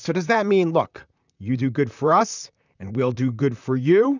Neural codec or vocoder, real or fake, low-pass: vocoder, 44.1 kHz, 128 mel bands, Pupu-Vocoder; fake; 7.2 kHz